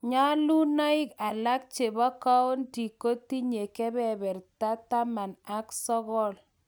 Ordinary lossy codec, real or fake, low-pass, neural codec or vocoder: none; real; none; none